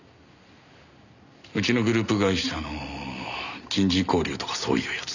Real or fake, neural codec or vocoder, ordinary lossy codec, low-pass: real; none; none; 7.2 kHz